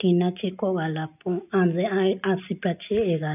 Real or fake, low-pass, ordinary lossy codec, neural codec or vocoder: real; 3.6 kHz; none; none